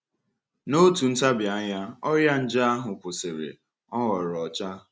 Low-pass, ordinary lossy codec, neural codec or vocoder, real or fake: none; none; none; real